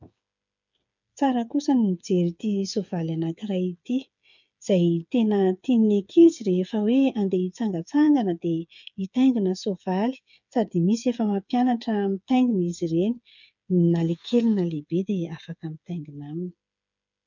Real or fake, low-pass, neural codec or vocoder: fake; 7.2 kHz; codec, 16 kHz, 8 kbps, FreqCodec, smaller model